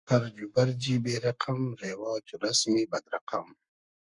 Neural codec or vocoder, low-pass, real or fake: codec, 44.1 kHz, 7.8 kbps, Pupu-Codec; 10.8 kHz; fake